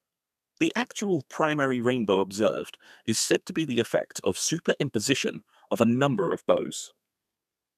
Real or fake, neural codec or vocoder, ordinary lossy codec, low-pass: fake; codec, 32 kHz, 1.9 kbps, SNAC; none; 14.4 kHz